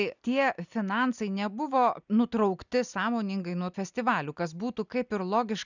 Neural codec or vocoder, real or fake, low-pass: none; real; 7.2 kHz